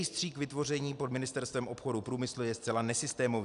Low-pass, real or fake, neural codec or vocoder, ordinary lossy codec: 10.8 kHz; real; none; AAC, 96 kbps